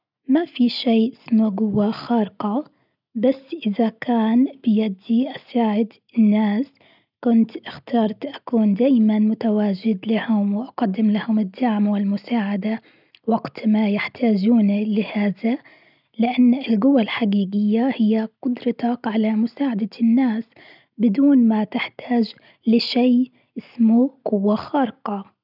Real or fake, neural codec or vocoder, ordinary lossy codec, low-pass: real; none; none; 5.4 kHz